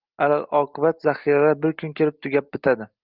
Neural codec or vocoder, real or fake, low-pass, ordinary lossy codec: none; real; 5.4 kHz; Opus, 32 kbps